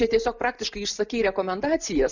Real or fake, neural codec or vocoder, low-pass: real; none; 7.2 kHz